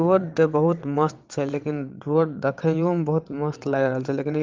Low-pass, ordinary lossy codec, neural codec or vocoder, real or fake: 7.2 kHz; Opus, 24 kbps; vocoder, 22.05 kHz, 80 mel bands, WaveNeXt; fake